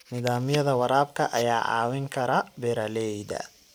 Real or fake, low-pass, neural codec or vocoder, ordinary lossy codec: real; none; none; none